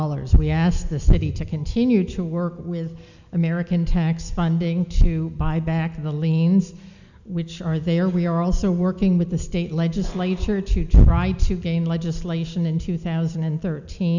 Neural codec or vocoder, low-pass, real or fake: none; 7.2 kHz; real